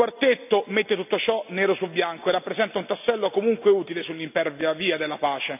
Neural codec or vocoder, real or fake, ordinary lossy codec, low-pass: none; real; none; 3.6 kHz